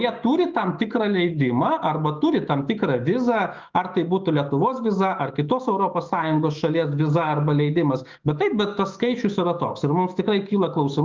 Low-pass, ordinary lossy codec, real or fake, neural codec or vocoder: 7.2 kHz; Opus, 24 kbps; fake; autoencoder, 48 kHz, 128 numbers a frame, DAC-VAE, trained on Japanese speech